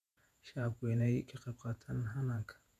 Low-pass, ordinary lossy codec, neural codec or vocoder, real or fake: 14.4 kHz; none; vocoder, 48 kHz, 128 mel bands, Vocos; fake